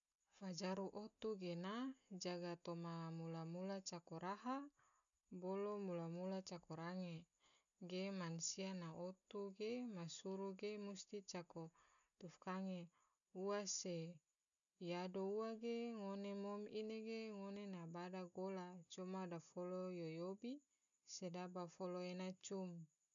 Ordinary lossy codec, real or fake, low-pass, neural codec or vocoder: none; real; 7.2 kHz; none